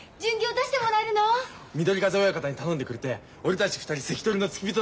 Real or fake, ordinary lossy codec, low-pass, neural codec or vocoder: real; none; none; none